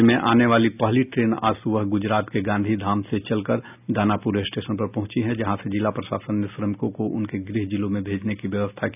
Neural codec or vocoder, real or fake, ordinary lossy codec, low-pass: none; real; none; 3.6 kHz